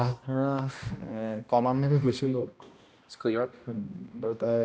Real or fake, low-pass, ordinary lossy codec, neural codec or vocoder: fake; none; none; codec, 16 kHz, 1 kbps, X-Codec, HuBERT features, trained on balanced general audio